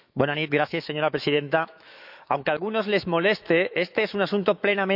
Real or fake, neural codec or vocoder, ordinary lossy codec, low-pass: fake; autoencoder, 48 kHz, 128 numbers a frame, DAC-VAE, trained on Japanese speech; none; 5.4 kHz